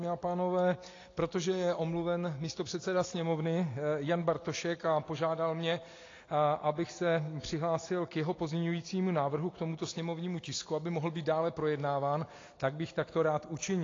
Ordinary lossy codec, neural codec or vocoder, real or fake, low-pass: AAC, 32 kbps; none; real; 7.2 kHz